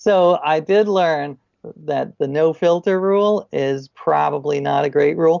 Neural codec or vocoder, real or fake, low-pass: none; real; 7.2 kHz